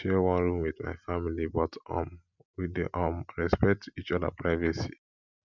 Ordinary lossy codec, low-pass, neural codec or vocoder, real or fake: none; 7.2 kHz; none; real